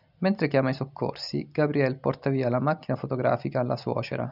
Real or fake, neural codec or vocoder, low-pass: real; none; 5.4 kHz